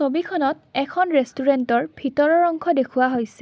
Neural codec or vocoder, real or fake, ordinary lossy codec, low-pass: none; real; none; none